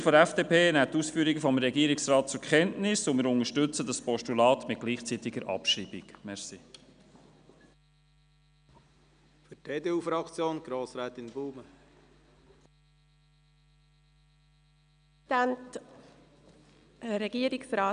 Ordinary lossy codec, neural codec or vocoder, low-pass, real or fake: none; none; 9.9 kHz; real